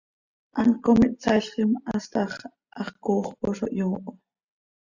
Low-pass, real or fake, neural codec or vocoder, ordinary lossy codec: 7.2 kHz; real; none; Opus, 64 kbps